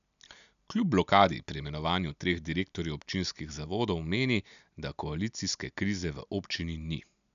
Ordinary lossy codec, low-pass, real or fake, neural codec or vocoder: none; 7.2 kHz; real; none